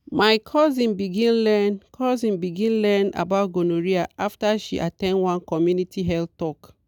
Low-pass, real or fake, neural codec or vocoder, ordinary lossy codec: 19.8 kHz; real; none; none